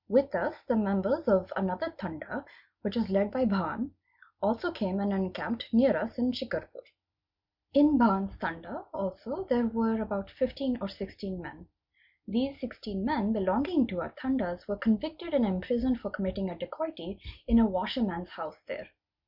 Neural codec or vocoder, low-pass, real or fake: none; 5.4 kHz; real